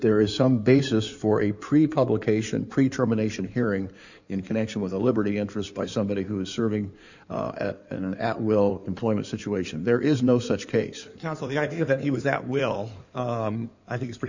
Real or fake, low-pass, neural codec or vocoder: fake; 7.2 kHz; codec, 16 kHz in and 24 kHz out, 2.2 kbps, FireRedTTS-2 codec